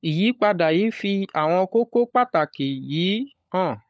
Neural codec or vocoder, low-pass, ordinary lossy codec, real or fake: codec, 16 kHz, 16 kbps, FunCodec, trained on LibriTTS, 50 frames a second; none; none; fake